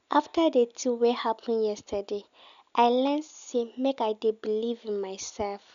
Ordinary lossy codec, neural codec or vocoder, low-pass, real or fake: none; none; 7.2 kHz; real